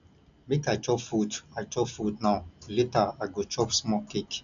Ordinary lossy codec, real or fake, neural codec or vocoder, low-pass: none; real; none; 7.2 kHz